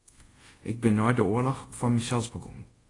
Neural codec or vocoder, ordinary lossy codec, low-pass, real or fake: codec, 24 kHz, 0.5 kbps, DualCodec; AAC, 32 kbps; 10.8 kHz; fake